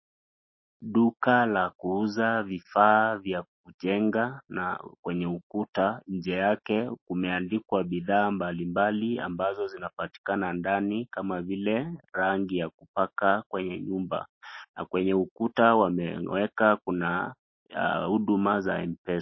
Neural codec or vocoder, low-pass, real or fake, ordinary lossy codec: none; 7.2 kHz; real; MP3, 24 kbps